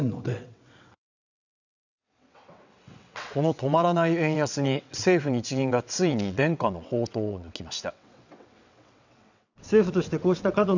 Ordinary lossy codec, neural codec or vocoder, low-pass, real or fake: none; vocoder, 22.05 kHz, 80 mel bands, WaveNeXt; 7.2 kHz; fake